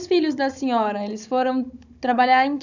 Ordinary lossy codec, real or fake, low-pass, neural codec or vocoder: none; fake; 7.2 kHz; codec, 16 kHz, 16 kbps, FunCodec, trained on Chinese and English, 50 frames a second